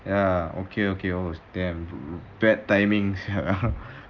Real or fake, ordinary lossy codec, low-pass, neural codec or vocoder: real; Opus, 24 kbps; 7.2 kHz; none